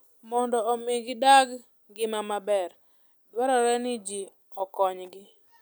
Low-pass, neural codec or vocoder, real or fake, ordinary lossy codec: none; none; real; none